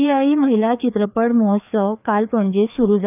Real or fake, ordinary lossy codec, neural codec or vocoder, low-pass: fake; none; codec, 16 kHz, 2 kbps, FreqCodec, larger model; 3.6 kHz